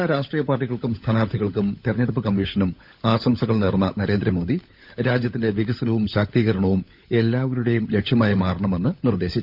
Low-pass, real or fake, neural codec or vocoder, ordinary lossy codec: 5.4 kHz; fake; codec, 16 kHz, 16 kbps, FunCodec, trained on LibriTTS, 50 frames a second; none